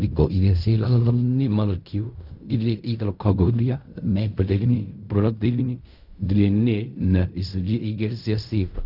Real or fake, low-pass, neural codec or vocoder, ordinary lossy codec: fake; 5.4 kHz; codec, 16 kHz in and 24 kHz out, 0.4 kbps, LongCat-Audio-Codec, fine tuned four codebook decoder; none